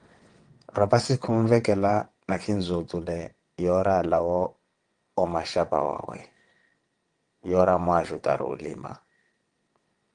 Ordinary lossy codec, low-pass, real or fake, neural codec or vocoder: Opus, 24 kbps; 9.9 kHz; fake; vocoder, 22.05 kHz, 80 mel bands, WaveNeXt